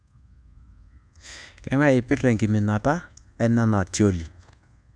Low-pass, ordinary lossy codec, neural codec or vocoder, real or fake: 9.9 kHz; none; codec, 24 kHz, 1.2 kbps, DualCodec; fake